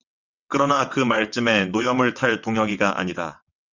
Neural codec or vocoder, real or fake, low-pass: vocoder, 22.05 kHz, 80 mel bands, WaveNeXt; fake; 7.2 kHz